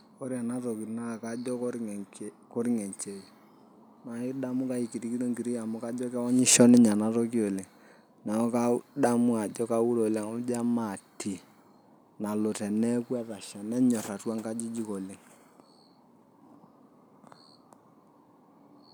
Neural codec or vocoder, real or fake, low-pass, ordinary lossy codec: none; real; none; none